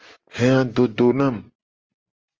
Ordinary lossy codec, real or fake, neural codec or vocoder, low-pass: Opus, 24 kbps; real; none; 7.2 kHz